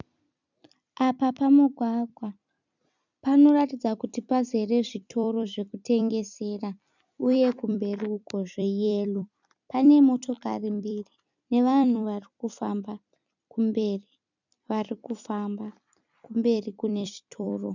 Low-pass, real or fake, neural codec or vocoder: 7.2 kHz; fake; vocoder, 44.1 kHz, 128 mel bands every 256 samples, BigVGAN v2